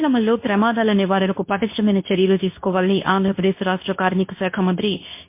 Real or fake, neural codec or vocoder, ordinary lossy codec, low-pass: fake; codec, 24 kHz, 0.9 kbps, WavTokenizer, medium speech release version 2; MP3, 24 kbps; 3.6 kHz